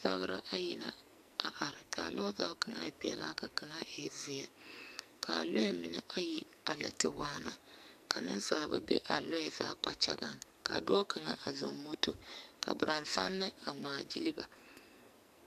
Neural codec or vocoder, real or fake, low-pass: codec, 44.1 kHz, 2.6 kbps, SNAC; fake; 14.4 kHz